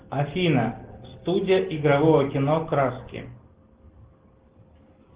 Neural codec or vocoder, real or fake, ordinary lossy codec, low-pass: none; real; Opus, 16 kbps; 3.6 kHz